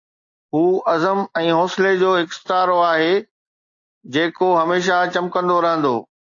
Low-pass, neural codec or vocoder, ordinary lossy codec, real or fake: 7.2 kHz; none; AAC, 48 kbps; real